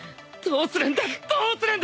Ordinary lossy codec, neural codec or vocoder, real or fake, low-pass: none; none; real; none